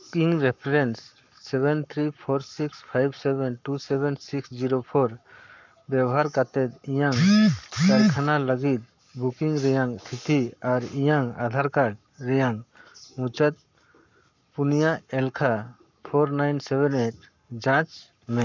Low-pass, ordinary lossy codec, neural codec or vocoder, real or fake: 7.2 kHz; none; codec, 44.1 kHz, 7.8 kbps, Pupu-Codec; fake